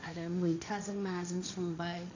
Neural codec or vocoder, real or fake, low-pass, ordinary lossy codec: codec, 16 kHz, 1.1 kbps, Voila-Tokenizer; fake; 7.2 kHz; none